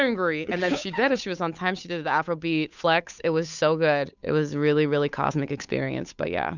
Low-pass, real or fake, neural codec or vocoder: 7.2 kHz; fake; codec, 16 kHz, 8 kbps, FunCodec, trained on Chinese and English, 25 frames a second